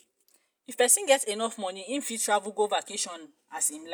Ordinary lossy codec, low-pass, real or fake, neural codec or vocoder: none; none; real; none